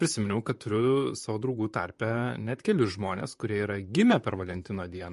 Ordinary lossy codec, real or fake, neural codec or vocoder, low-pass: MP3, 48 kbps; fake; vocoder, 44.1 kHz, 128 mel bands, Pupu-Vocoder; 14.4 kHz